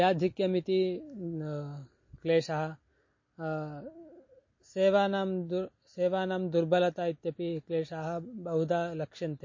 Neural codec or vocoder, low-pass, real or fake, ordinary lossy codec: none; 7.2 kHz; real; MP3, 32 kbps